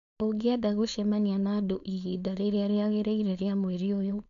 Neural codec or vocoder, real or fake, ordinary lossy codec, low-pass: codec, 16 kHz, 4.8 kbps, FACodec; fake; none; 7.2 kHz